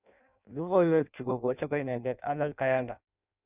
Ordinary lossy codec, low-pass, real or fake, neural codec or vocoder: none; 3.6 kHz; fake; codec, 16 kHz in and 24 kHz out, 0.6 kbps, FireRedTTS-2 codec